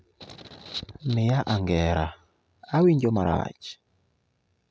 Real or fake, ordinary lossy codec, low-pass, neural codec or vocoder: real; none; none; none